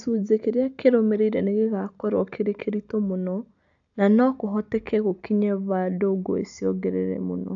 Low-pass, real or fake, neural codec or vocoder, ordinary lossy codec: 7.2 kHz; real; none; AAC, 64 kbps